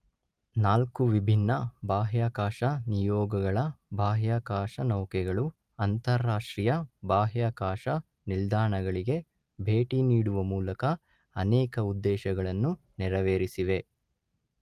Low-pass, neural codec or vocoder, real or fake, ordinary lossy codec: 14.4 kHz; none; real; Opus, 32 kbps